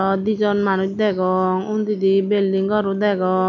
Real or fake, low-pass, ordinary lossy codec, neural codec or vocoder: real; 7.2 kHz; none; none